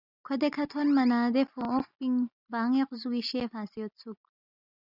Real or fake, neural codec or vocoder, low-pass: real; none; 5.4 kHz